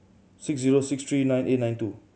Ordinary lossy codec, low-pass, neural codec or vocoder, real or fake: none; none; none; real